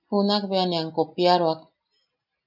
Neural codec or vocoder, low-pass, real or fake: none; 5.4 kHz; real